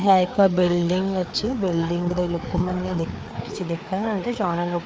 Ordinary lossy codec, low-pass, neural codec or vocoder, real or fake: none; none; codec, 16 kHz, 4 kbps, FreqCodec, larger model; fake